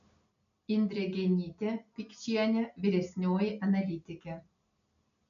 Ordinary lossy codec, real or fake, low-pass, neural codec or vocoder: MP3, 96 kbps; real; 7.2 kHz; none